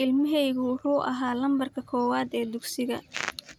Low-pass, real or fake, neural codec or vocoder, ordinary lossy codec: 19.8 kHz; real; none; none